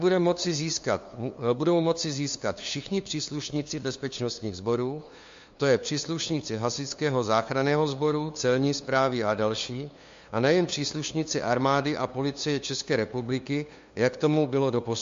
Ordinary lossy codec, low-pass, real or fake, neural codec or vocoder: MP3, 48 kbps; 7.2 kHz; fake; codec, 16 kHz, 2 kbps, FunCodec, trained on LibriTTS, 25 frames a second